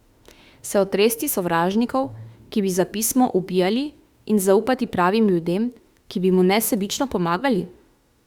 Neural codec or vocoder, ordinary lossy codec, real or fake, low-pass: autoencoder, 48 kHz, 32 numbers a frame, DAC-VAE, trained on Japanese speech; Opus, 64 kbps; fake; 19.8 kHz